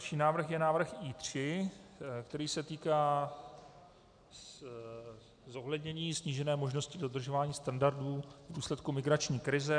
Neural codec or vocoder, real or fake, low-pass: none; real; 9.9 kHz